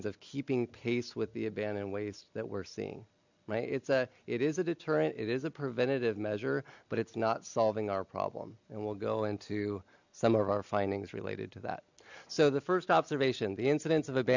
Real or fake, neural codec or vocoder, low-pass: real; none; 7.2 kHz